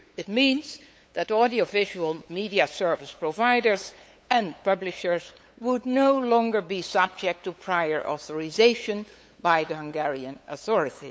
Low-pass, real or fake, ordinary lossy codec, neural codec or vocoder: none; fake; none; codec, 16 kHz, 8 kbps, FunCodec, trained on LibriTTS, 25 frames a second